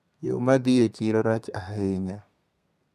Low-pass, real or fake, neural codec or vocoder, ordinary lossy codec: 14.4 kHz; fake; codec, 32 kHz, 1.9 kbps, SNAC; none